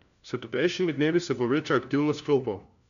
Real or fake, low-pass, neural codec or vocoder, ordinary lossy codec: fake; 7.2 kHz; codec, 16 kHz, 1 kbps, FunCodec, trained on LibriTTS, 50 frames a second; none